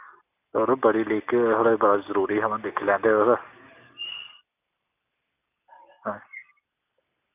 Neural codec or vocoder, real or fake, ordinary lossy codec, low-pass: none; real; none; 3.6 kHz